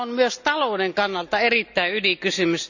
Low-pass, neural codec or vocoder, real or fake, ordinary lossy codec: 7.2 kHz; none; real; none